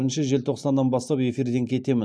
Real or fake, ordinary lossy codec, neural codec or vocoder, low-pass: real; none; none; none